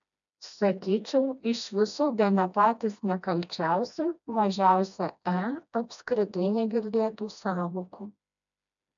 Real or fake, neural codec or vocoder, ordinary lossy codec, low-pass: fake; codec, 16 kHz, 1 kbps, FreqCodec, smaller model; MP3, 96 kbps; 7.2 kHz